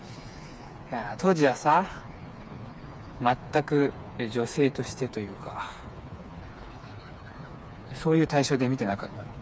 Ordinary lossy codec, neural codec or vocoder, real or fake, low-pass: none; codec, 16 kHz, 4 kbps, FreqCodec, smaller model; fake; none